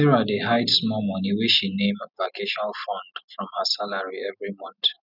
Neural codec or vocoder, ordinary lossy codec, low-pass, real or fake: none; none; 5.4 kHz; real